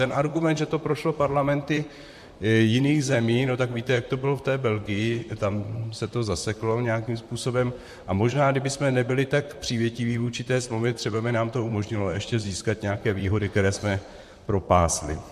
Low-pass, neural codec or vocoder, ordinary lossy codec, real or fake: 14.4 kHz; vocoder, 44.1 kHz, 128 mel bands, Pupu-Vocoder; MP3, 64 kbps; fake